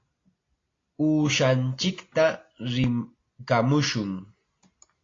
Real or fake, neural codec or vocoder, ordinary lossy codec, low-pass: real; none; AAC, 32 kbps; 7.2 kHz